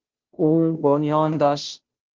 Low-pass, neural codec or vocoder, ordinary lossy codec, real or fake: 7.2 kHz; codec, 16 kHz, 0.5 kbps, FunCodec, trained on Chinese and English, 25 frames a second; Opus, 16 kbps; fake